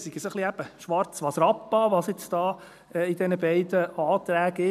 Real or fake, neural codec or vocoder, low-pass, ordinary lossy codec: real; none; 14.4 kHz; none